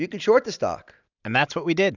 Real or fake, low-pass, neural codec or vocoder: real; 7.2 kHz; none